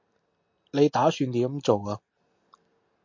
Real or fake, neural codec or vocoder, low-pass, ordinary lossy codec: real; none; 7.2 kHz; MP3, 48 kbps